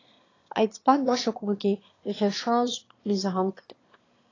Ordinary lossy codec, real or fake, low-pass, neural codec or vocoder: AAC, 32 kbps; fake; 7.2 kHz; autoencoder, 22.05 kHz, a latent of 192 numbers a frame, VITS, trained on one speaker